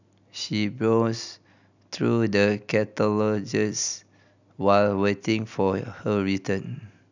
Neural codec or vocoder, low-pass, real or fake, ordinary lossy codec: none; 7.2 kHz; real; none